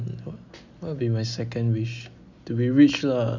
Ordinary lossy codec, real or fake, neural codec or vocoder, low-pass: none; real; none; 7.2 kHz